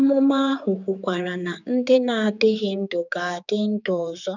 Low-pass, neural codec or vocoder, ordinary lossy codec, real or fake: 7.2 kHz; codec, 16 kHz, 4 kbps, X-Codec, HuBERT features, trained on general audio; none; fake